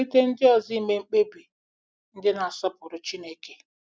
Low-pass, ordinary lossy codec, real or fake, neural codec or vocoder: none; none; real; none